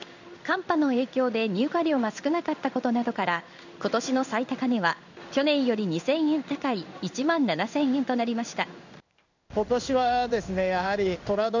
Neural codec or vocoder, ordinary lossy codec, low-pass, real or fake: codec, 16 kHz in and 24 kHz out, 1 kbps, XY-Tokenizer; none; 7.2 kHz; fake